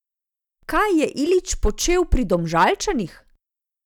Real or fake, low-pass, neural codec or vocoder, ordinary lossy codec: real; 19.8 kHz; none; none